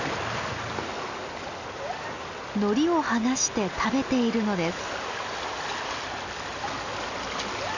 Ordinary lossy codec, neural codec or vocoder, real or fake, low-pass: none; none; real; 7.2 kHz